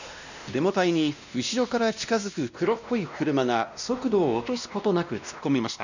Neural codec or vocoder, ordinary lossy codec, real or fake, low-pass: codec, 16 kHz, 1 kbps, X-Codec, WavLM features, trained on Multilingual LibriSpeech; none; fake; 7.2 kHz